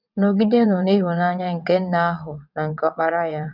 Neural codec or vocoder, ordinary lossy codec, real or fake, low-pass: vocoder, 22.05 kHz, 80 mel bands, WaveNeXt; none; fake; 5.4 kHz